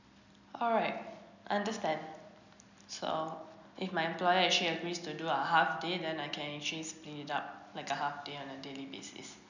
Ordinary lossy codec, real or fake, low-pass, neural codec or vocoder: none; real; 7.2 kHz; none